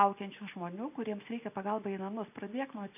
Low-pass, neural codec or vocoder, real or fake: 3.6 kHz; none; real